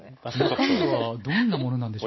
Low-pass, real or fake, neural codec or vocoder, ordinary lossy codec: 7.2 kHz; real; none; MP3, 24 kbps